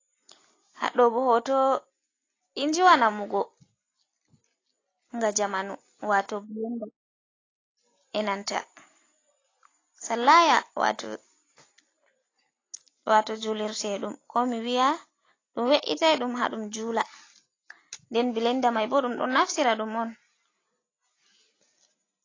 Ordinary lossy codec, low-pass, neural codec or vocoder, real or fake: AAC, 32 kbps; 7.2 kHz; none; real